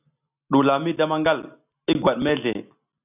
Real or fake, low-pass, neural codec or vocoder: real; 3.6 kHz; none